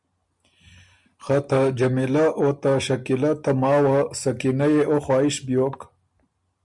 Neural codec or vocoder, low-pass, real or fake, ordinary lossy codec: none; 10.8 kHz; real; MP3, 96 kbps